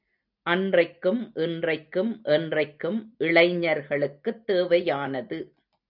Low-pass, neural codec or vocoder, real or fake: 5.4 kHz; none; real